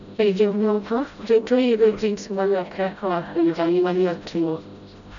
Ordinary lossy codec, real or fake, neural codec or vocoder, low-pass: MP3, 96 kbps; fake; codec, 16 kHz, 0.5 kbps, FreqCodec, smaller model; 7.2 kHz